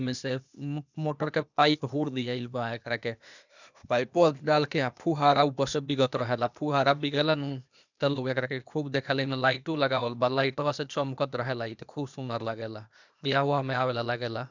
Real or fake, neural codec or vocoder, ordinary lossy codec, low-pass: fake; codec, 16 kHz, 0.8 kbps, ZipCodec; none; 7.2 kHz